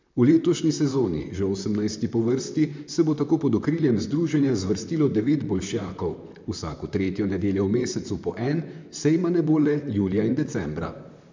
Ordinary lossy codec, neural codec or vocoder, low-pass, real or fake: MP3, 64 kbps; vocoder, 44.1 kHz, 128 mel bands, Pupu-Vocoder; 7.2 kHz; fake